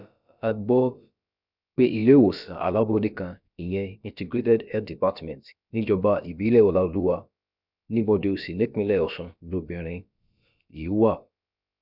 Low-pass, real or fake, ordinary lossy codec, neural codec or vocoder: 5.4 kHz; fake; none; codec, 16 kHz, about 1 kbps, DyCAST, with the encoder's durations